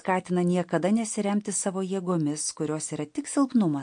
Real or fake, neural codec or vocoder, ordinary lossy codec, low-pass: real; none; MP3, 48 kbps; 9.9 kHz